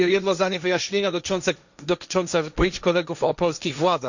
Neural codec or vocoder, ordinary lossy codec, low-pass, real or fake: codec, 16 kHz, 1.1 kbps, Voila-Tokenizer; none; 7.2 kHz; fake